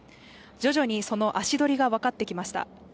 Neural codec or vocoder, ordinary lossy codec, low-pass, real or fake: none; none; none; real